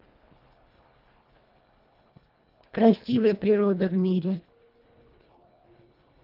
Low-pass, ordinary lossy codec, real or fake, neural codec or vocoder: 5.4 kHz; Opus, 24 kbps; fake; codec, 24 kHz, 1.5 kbps, HILCodec